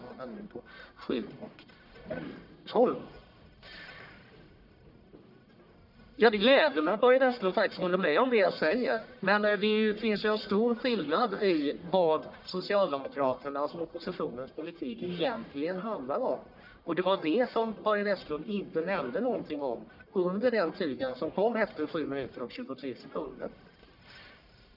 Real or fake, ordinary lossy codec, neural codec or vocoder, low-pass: fake; none; codec, 44.1 kHz, 1.7 kbps, Pupu-Codec; 5.4 kHz